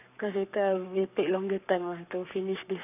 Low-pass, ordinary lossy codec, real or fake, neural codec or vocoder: 3.6 kHz; none; fake; codec, 44.1 kHz, 7.8 kbps, Pupu-Codec